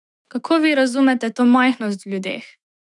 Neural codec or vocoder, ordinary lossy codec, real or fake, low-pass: autoencoder, 48 kHz, 128 numbers a frame, DAC-VAE, trained on Japanese speech; none; fake; 10.8 kHz